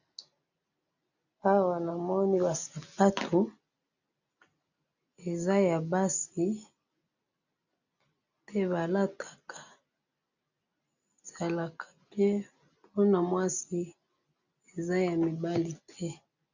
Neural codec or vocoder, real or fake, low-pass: none; real; 7.2 kHz